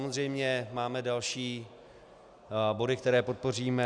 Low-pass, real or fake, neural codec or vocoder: 9.9 kHz; real; none